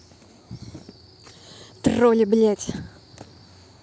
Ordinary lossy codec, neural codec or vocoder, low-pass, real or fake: none; none; none; real